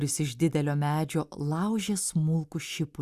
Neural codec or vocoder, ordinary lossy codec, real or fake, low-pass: none; Opus, 64 kbps; real; 14.4 kHz